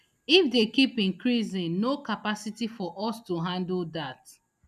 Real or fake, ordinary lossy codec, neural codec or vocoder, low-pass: real; none; none; 14.4 kHz